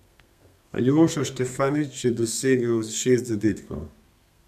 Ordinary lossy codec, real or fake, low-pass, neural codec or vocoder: none; fake; 14.4 kHz; codec, 32 kHz, 1.9 kbps, SNAC